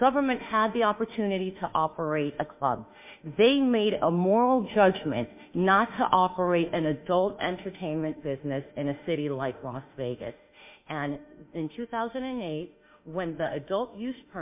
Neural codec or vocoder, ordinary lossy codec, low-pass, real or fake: autoencoder, 48 kHz, 32 numbers a frame, DAC-VAE, trained on Japanese speech; MP3, 32 kbps; 3.6 kHz; fake